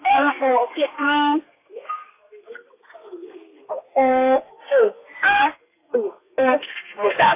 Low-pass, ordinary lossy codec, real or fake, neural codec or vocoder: 3.6 kHz; AAC, 24 kbps; fake; codec, 32 kHz, 1.9 kbps, SNAC